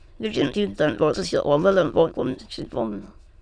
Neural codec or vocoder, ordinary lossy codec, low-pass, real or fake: autoencoder, 22.05 kHz, a latent of 192 numbers a frame, VITS, trained on many speakers; Opus, 64 kbps; 9.9 kHz; fake